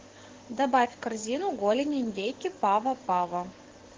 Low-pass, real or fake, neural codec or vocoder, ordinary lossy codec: 7.2 kHz; fake; codec, 44.1 kHz, 7.8 kbps, DAC; Opus, 16 kbps